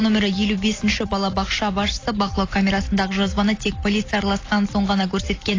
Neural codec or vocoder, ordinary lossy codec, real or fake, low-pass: none; AAC, 32 kbps; real; 7.2 kHz